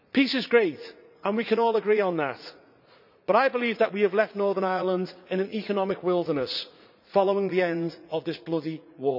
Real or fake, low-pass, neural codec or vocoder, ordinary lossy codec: fake; 5.4 kHz; vocoder, 44.1 kHz, 80 mel bands, Vocos; none